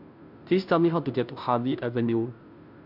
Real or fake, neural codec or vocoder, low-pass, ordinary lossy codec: fake; codec, 16 kHz, 0.5 kbps, FunCodec, trained on Chinese and English, 25 frames a second; 5.4 kHz; AAC, 48 kbps